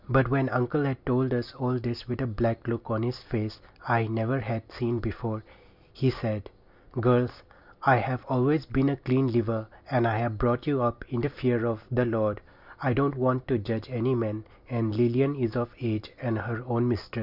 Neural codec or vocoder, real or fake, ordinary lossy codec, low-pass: none; real; Opus, 64 kbps; 5.4 kHz